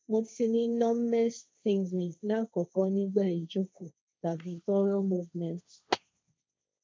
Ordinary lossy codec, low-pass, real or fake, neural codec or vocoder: none; none; fake; codec, 16 kHz, 1.1 kbps, Voila-Tokenizer